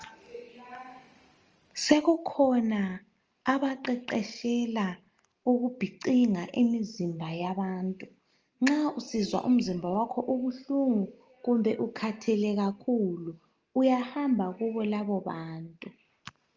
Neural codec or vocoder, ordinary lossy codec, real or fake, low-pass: none; Opus, 24 kbps; real; 7.2 kHz